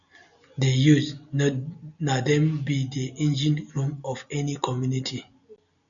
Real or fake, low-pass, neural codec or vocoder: real; 7.2 kHz; none